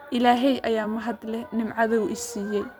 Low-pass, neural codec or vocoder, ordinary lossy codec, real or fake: none; vocoder, 44.1 kHz, 128 mel bands every 256 samples, BigVGAN v2; none; fake